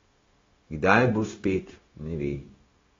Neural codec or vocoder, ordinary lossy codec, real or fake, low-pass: codec, 16 kHz, 0.9 kbps, LongCat-Audio-Codec; AAC, 24 kbps; fake; 7.2 kHz